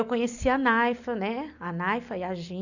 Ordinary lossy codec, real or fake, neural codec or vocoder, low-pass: none; real; none; 7.2 kHz